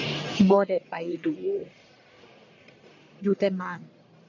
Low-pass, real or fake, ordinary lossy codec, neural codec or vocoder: 7.2 kHz; fake; none; codec, 44.1 kHz, 1.7 kbps, Pupu-Codec